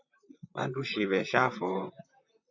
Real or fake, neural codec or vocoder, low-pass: fake; vocoder, 44.1 kHz, 128 mel bands, Pupu-Vocoder; 7.2 kHz